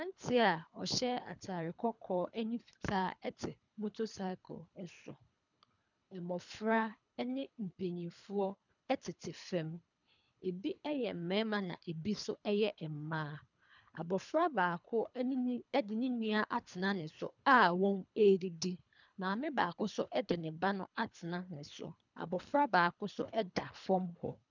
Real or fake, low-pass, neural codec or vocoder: fake; 7.2 kHz; codec, 24 kHz, 3 kbps, HILCodec